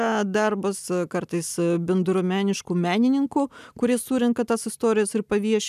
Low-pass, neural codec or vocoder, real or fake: 14.4 kHz; none; real